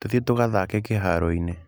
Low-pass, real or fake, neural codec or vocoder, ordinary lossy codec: none; real; none; none